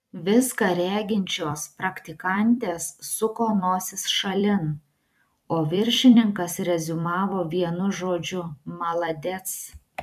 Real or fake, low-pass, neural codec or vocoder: fake; 14.4 kHz; vocoder, 44.1 kHz, 128 mel bands every 256 samples, BigVGAN v2